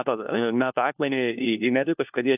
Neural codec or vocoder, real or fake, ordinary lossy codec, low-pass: codec, 16 kHz, 1 kbps, FunCodec, trained on LibriTTS, 50 frames a second; fake; AAC, 32 kbps; 3.6 kHz